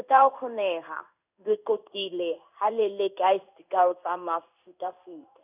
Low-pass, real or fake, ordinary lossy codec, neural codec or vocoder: 3.6 kHz; fake; none; codec, 16 kHz in and 24 kHz out, 1 kbps, XY-Tokenizer